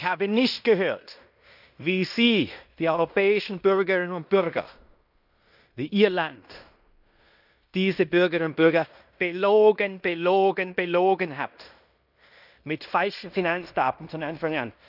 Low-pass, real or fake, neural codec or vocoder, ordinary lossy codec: 5.4 kHz; fake; codec, 16 kHz in and 24 kHz out, 0.9 kbps, LongCat-Audio-Codec, fine tuned four codebook decoder; none